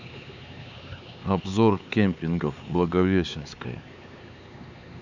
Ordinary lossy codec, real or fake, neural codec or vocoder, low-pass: none; fake; codec, 16 kHz, 4 kbps, X-Codec, HuBERT features, trained on LibriSpeech; 7.2 kHz